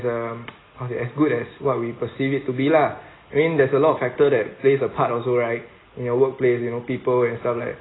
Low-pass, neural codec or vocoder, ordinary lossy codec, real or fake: 7.2 kHz; none; AAC, 16 kbps; real